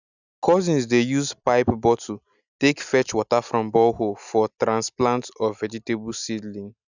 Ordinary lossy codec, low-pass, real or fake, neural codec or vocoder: none; 7.2 kHz; real; none